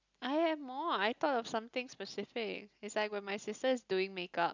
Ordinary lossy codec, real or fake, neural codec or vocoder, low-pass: none; real; none; 7.2 kHz